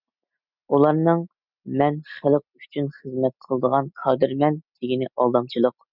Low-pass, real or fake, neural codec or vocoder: 5.4 kHz; real; none